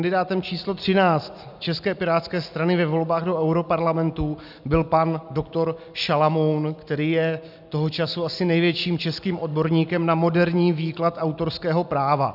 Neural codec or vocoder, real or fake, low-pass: none; real; 5.4 kHz